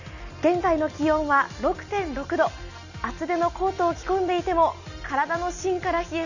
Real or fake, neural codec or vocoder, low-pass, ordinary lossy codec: real; none; 7.2 kHz; none